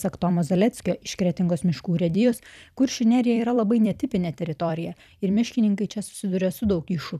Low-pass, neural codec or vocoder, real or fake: 14.4 kHz; vocoder, 44.1 kHz, 128 mel bands every 256 samples, BigVGAN v2; fake